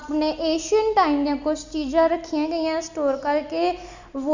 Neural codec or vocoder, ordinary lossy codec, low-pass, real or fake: none; none; 7.2 kHz; real